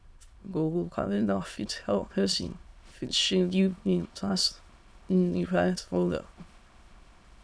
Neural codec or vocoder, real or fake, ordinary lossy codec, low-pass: autoencoder, 22.05 kHz, a latent of 192 numbers a frame, VITS, trained on many speakers; fake; none; none